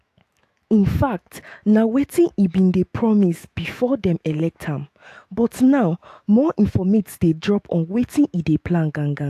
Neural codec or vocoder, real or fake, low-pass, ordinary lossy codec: autoencoder, 48 kHz, 128 numbers a frame, DAC-VAE, trained on Japanese speech; fake; 14.4 kHz; AAC, 64 kbps